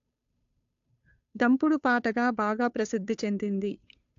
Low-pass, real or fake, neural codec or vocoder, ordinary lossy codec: 7.2 kHz; fake; codec, 16 kHz, 2 kbps, FunCodec, trained on Chinese and English, 25 frames a second; AAC, 64 kbps